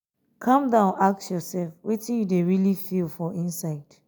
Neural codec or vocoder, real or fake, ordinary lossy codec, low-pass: none; real; none; none